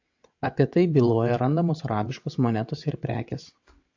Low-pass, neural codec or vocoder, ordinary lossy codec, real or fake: 7.2 kHz; vocoder, 22.05 kHz, 80 mel bands, WaveNeXt; AAC, 48 kbps; fake